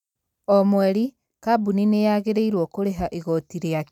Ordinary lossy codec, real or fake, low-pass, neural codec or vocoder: none; real; 19.8 kHz; none